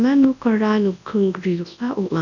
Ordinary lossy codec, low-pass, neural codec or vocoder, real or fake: none; 7.2 kHz; codec, 24 kHz, 0.9 kbps, WavTokenizer, large speech release; fake